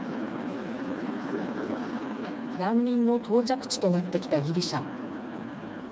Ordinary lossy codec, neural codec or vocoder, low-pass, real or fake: none; codec, 16 kHz, 2 kbps, FreqCodec, smaller model; none; fake